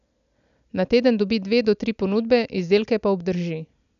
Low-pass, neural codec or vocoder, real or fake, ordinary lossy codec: 7.2 kHz; none; real; none